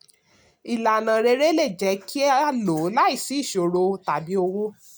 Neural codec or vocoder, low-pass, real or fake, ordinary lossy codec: none; none; real; none